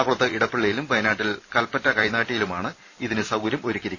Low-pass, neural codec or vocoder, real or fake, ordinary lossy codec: 7.2 kHz; vocoder, 44.1 kHz, 128 mel bands every 256 samples, BigVGAN v2; fake; none